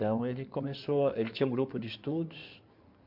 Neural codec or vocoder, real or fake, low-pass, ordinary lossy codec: codec, 16 kHz in and 24 kHz out, 2.2 kbps, FireRedTTS-2 codec; fake; 5.4 kHz; MP3, 48 kbps